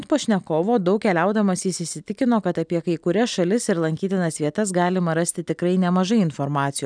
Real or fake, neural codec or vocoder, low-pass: real; none; 9.9 kHz